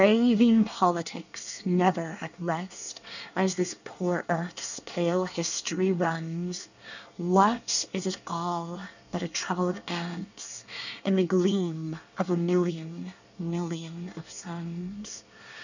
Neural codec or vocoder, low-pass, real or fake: codec, 24 kHz, 1 kbps, SNAC; 7.2 kHz; fake